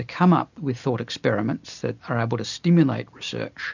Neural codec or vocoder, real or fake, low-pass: none; real; 7.2 kHz